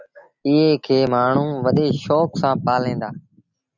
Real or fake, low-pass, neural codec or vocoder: real; 7.2 kHz; none